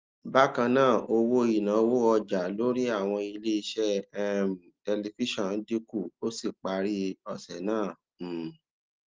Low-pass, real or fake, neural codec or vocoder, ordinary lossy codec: 7.2 kHz; real; none; Opus, 32 kbps